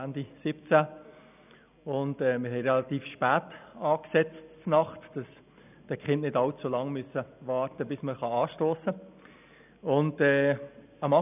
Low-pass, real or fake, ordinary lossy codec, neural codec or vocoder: 3.6 kHz; real; none; none